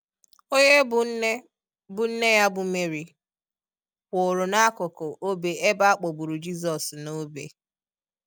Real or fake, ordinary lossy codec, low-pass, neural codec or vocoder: real; none; none; none